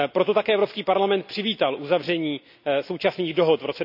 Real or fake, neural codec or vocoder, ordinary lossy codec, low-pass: real; none; none; 5.4 kHz